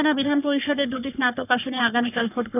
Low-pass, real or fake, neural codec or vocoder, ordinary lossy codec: 3.6 kHz; fake; codec, 44.1 kHz, 3.4 kbps, Pupu-Codec; none